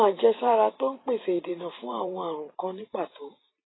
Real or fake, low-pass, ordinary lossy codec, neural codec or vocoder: fake; 7.2 kHz; AAC, 16 kbps; vocoder, 44.1 kHz, 128 mel bands every 512 samples, BigVGAN v2